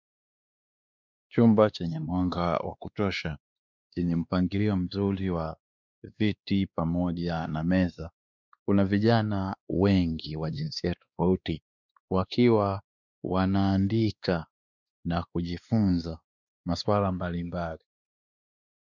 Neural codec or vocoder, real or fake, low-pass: codec, 16 kHz, 2 kbps, X-Codec, WavLM features, trained on Multilingual LibriSpeech; fake; 7.2 kHz